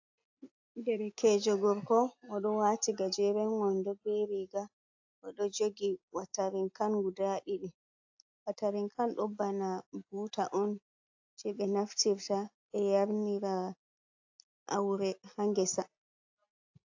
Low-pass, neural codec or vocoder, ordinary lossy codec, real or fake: 7.2 kHz; none; AAC, 48 kbps; real